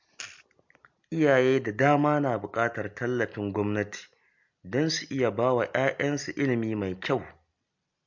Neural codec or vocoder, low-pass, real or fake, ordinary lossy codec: none; 7.2 kHz; real; MP3, 48 kbps